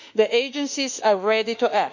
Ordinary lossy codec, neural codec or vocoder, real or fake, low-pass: none; autoencoder, 48 kHz, 32 numbers a frame, DAC-VAE, trained on Japanese speech; fake; 7.2 kHz